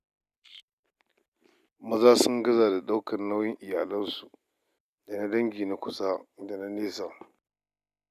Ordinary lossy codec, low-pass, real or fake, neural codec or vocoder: none; 14.4 kHz; real; none